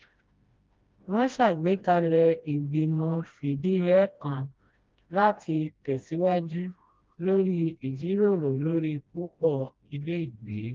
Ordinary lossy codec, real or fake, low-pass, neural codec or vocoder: Opus, 32 kbps; fake; 7.2 kHz; codec, 16 kHz, 1 kbps, FreqCodec, smaller model